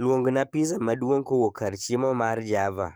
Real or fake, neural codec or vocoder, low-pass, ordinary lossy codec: fake; codec, 44.1 kHz, 7.8 kbps, DAC; none; none